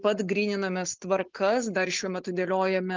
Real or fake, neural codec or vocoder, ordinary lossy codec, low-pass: real; none; Opus, 24 kbps; 7.2 kHz